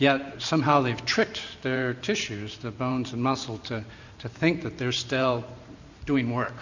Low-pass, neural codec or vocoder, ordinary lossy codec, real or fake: 7.2 kHz; vocoder, 44.1 kHz, 128 mel bands every 512 samples, BigVGAN v2; Opus, 64 kbps; fake